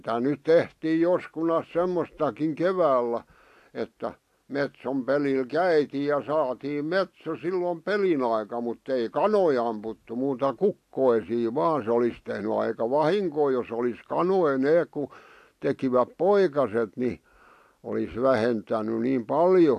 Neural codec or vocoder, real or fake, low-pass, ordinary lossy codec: none; real; 14.4 kHz; AAC, 64 kbps